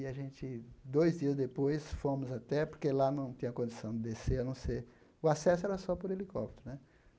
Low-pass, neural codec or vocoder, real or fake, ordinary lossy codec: none; none; real; none